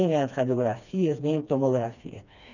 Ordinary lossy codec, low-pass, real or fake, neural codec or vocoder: none; 7.2 kHz; fake; codec, 16 kHz, 2 kbps, FreqCodec, smaller model